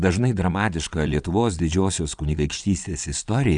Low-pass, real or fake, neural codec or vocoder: 9.9 kHz; fake; vocoder, 22.05 kHz, 80 mel bands, Vocos